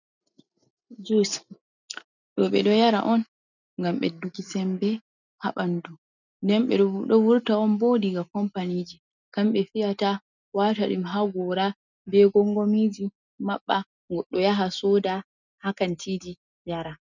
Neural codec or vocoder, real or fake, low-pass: none; real; 7.2 kHz